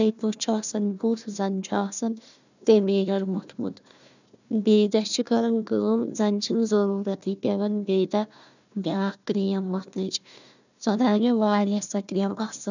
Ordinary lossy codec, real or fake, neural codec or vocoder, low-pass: none; fake; codec, 16 kHz, 1 kbps, FunCodec, trained on Chinese and English, 50 frames a second; 7.2 kHz